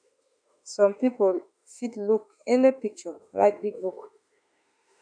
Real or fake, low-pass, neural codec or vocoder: fake; 9.9 kHz; autoencoder, 48 kHz, 32 numbers a frame, DAC-VAE, trained on Japanese speech